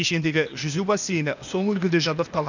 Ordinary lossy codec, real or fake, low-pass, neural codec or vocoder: none; fake; 7.2 kHz; codec, 16 kHz, 0.8 kbps, ZipCodec